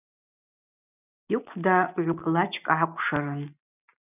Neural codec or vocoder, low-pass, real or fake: none; 3.6 kHz; real